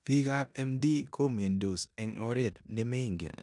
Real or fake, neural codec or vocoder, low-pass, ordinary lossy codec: fake; codec, 16 kHz in and 24 kHz out, 0.9 kbps, LongCat-Audio-Codec, four codebook decoder; 10.8 kHz; none